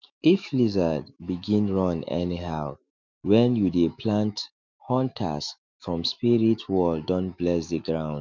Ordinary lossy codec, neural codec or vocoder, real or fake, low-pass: MP3, 64 kbps; autoencoder, 48 kHz, 128 numbers a frame, DAC-VAE, trained on Japanese speech; fake; 7.2 kHz